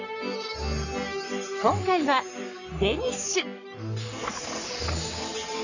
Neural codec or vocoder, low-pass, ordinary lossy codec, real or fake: codec, 44.1 kHz, 3.4 kbps, Pupu-Codec; 7.2 kHz; none; fake